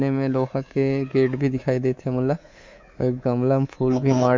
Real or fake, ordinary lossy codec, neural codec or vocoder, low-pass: fake; MP3, 64 kbps; codec, 24 kHz, 3.1 kbps, DualCodec; 7.2 kHz